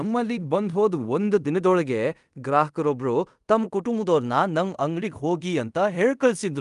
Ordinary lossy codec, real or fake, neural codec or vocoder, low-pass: Opus, 32 kbps; fake; codec, 24 kHz, 0.5 kbps, DualCodec; 10.8 kHz